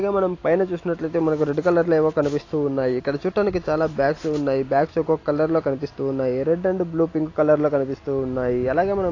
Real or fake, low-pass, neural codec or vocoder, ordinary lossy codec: real; 7.2 kHz; none; AAC, 32 kbps